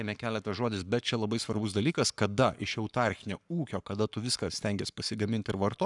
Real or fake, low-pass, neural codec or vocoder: fake; 10.8 kHz; codec, 44.1 kHz, 7.8 kbps, Pupu-Codec